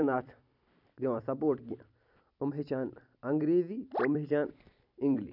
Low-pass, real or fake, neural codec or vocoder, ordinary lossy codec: 5.4 kHz; fake; vocoder, 44.1 kHz, 128 mel bands every 256 samples, BigVGAN v2; none